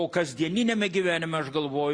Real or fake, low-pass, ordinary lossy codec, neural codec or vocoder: real; 9.9 kHz; Opus, 64 kbps; none